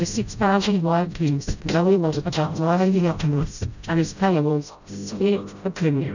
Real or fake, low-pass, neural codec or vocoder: fake; 7.2 kHz; codec, 16 kHz, 0.5 kbps, FreqCodec, smaller model